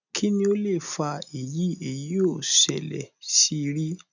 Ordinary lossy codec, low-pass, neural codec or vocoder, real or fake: none; 7.2 kHz; none; real